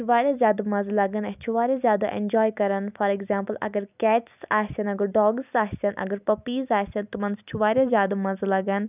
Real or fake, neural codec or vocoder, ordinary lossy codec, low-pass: real; none; none; 3.6 kHz